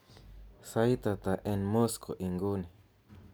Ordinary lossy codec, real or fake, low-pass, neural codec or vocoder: none; real; none; none